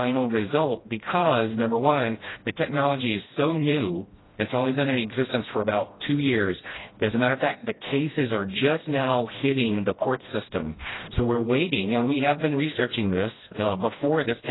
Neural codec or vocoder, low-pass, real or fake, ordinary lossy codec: codec, 16 kHz, 1 kbps, FreqCodec, smaller model; 7.2 kHz; fake; AAC, 16 kbps